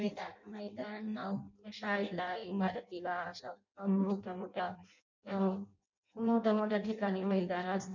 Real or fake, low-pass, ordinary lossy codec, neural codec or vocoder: fake; 7.2 kHz; none; codec, 16 kHz in and 24 kHz out, 0.6 kbps, FireRedTTS-2 codec